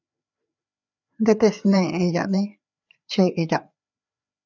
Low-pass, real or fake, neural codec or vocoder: 7.2 kHz; fake; codec, 16 kHz, 4 kbps, FreqCodec, larger model